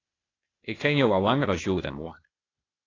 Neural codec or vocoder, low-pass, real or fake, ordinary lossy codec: codec, 16 kHz, 0.8 kbps, ZipCodec; 7.2 kHz; fake; AAC, 32 kbps